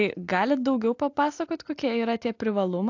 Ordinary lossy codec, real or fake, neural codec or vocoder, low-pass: AAC, 48 kbps; real; none; 7.2 kHz